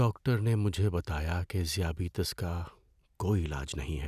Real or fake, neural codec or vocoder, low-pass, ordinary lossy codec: real; none; 14.4 kHz; none